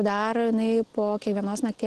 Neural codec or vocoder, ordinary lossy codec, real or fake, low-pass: none; Opus, 16 kbps; real; 10.8 kHz